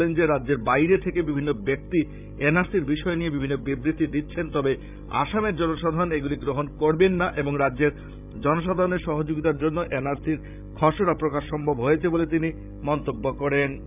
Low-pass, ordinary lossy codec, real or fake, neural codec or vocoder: 3.6 kHz; none; fake; codec, 16 kHz, 16 kbps, FreqCodec, larger model